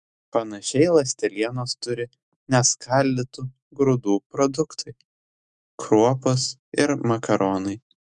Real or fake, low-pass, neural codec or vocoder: fake; 10.8 kHz; autoencoder, 48 kHz, 128 numbers a frame, DAC-VAE, trained on Japanese speech